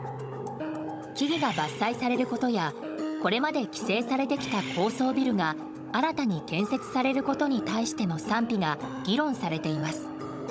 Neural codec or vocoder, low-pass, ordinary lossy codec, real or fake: codec, 16 kHz, 16 kbps, FunCodec, trained on Chinese and English, 50 frames a second; none; none; fake